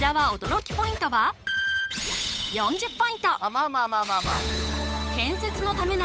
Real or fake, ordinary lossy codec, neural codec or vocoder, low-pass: fake; none; codec, 16 kHz, 8 kbps, FunCodec, trained on Chinese and English, 25 frames a second; none